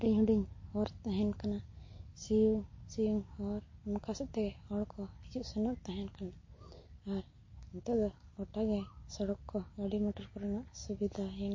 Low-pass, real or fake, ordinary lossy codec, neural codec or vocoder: 7.2 kHz; real; MP3, 32 kbps; none